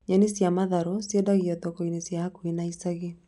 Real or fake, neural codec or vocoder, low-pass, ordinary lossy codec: real; none; 10.8 kHz; none